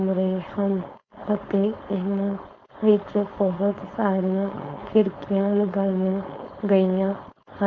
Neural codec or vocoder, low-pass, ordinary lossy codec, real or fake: codec, 16 kHz, 4.8 kbps, FACodec; 7.2 kHz; none; fake